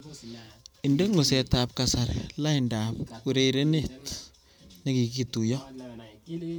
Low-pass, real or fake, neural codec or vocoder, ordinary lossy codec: none; fake; vocoder, 44.1 kHz, 128 mel bands every 512 samples, BigVGAN v2; none